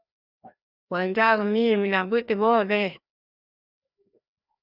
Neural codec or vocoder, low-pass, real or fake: codec, 16 kHz, 1 kbps, FreqCodec, larger model; 5.4 kHz; fake